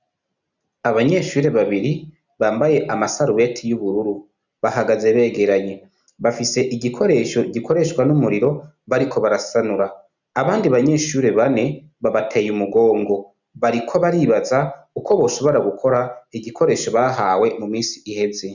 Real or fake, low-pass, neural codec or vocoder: real; 7.2 kHz; none